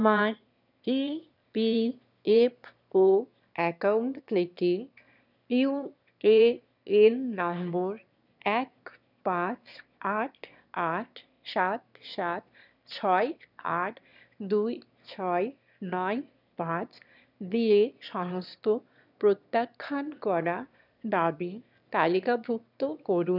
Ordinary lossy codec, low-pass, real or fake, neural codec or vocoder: none; 5.4 kHz; fake; autoencoder, 22.05 kHz, a latent of 192 numbers a frame, VITS, trained on one speaker